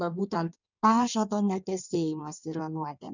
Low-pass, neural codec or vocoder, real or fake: 7.2 kHz; codec, 16 kHz in and 24 kHz out, 1.1 kbps, FireRedTTS-2 codec; fake